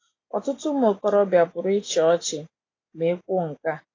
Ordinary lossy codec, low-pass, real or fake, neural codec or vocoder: AAC, 32 kbps; 7.2 kHz; real; none